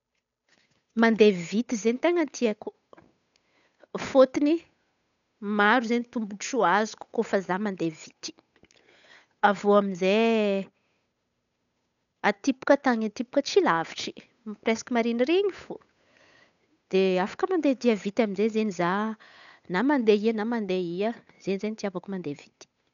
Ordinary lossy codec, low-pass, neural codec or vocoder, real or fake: none; 7.2 kHz; codec, 16 kHz, 8 kbps, FunCodec, trained on Chinese and English, 25 frames a second; fake